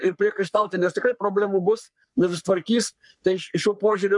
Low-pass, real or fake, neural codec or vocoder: 10.8 kHz; fake; codec, 44.1 kHz, 3.4 kbps, Pupu-Codec